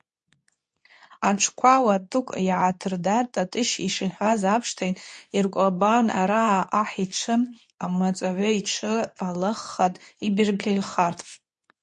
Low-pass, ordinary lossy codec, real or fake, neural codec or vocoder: 10.8 kHz; MP3, 48 kbps; fake; codec, 24 kHz, 0.9 kbps, WavTokenizer, medium speech release version 2